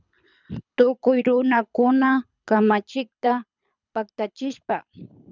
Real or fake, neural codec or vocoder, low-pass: fake; codec, 24 kHz, 6 kbps, HILCodec; 7.2 kHz